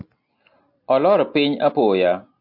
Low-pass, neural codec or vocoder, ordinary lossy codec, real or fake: 5.4 kHz; none; MP3, 48 kbps; real